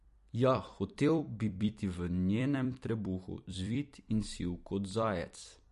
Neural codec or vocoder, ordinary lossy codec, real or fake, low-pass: vocoder, 44.1 kHz, 128 mel bands every 256 samples, BigVGAN v2; MP3, 48 kbps; fake; 14.4 kHz